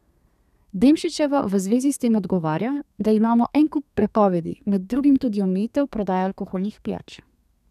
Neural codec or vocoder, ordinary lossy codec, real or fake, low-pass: codec, 32 kHz, 1.9 kbps, SNAC; none; fake; 14.4 kHz